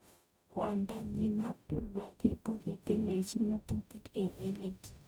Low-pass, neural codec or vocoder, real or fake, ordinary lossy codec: none; codec, 44.1 kHz, 0.9 kbps, DAC; fake; none